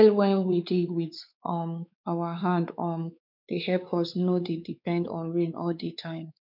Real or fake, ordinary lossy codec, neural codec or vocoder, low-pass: fake; none; codec, 16 kHz, 4 kbps, X-Codec, WavLM features, trained on Multilingual LibriSpeech; 5.4 kHz